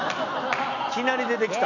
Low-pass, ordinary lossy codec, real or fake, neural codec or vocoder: 7.2 kHz; none; real; none